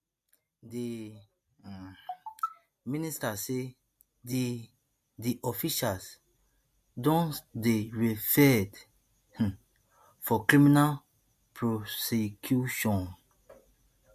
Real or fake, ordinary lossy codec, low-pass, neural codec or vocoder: real; MP3, 64 kbps; 14.4 kHz; none